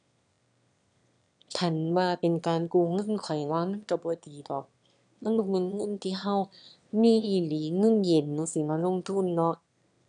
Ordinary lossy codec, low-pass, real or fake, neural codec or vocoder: none; 9.9 kHz; fake; autoencoder, 22.05 kHz, a latent of 192 numbers a frame, VITS, trained on one speaker